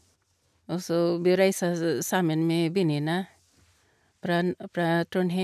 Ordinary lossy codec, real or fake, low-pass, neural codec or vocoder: none; real; 14.4 kHz; none